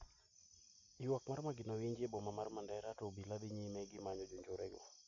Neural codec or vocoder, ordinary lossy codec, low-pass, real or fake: none; AAC, 64 kbps; 7.2 kHz; real